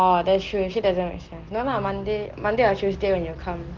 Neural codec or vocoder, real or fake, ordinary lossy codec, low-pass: none; real; Opus, 16 kbps; 7.2 kHz